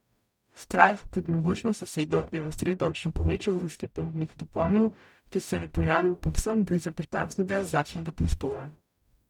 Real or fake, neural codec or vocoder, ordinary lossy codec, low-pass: fake; codec, 44.1 kHz, 0.9 kbps, DAC; none; 19.8 kHz